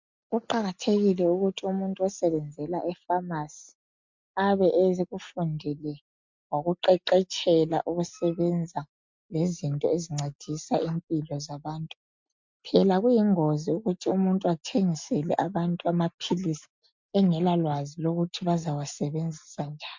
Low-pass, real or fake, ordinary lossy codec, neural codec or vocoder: 7.2 kHz; real; MP3, 64 kbps; none